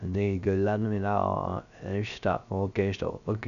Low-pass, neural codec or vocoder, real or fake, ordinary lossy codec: 7.2 kHz; codec, 16 kHz, 0.3 kbps, FocalCodec; fake; none